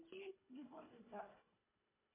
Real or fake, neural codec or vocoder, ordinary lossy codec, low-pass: fake; codec, 24 kHz, 1.5 kbps, HILCodec; MP3, 24 kbps; 3.6 kHz